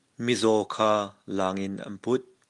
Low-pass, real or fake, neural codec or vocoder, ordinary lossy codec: 10.8 kHz; real; none; Opus, 32 kbps